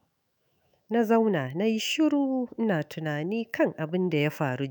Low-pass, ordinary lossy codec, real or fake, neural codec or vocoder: none; none; fake; autoencoder, 48 kHz, 128 numbers a frame, DAC-VAE, trained on Japanese speech